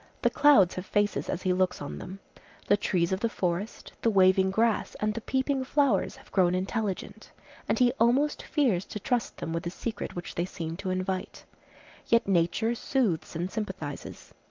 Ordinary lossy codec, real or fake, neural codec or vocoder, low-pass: Opus, 24 kbps; real; none; 7.2 kHz